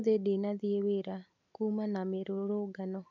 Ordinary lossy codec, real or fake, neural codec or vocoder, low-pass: none; real; none; 7.2 kHz